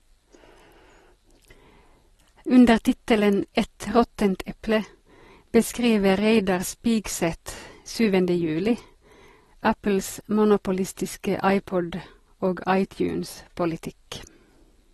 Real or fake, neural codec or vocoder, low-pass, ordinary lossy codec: real; none; 19.8 kHz; AAC, 32 kbps